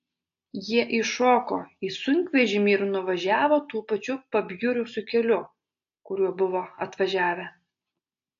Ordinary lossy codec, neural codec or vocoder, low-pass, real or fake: AAC, 48 kbps; none; 7.2 kHz; real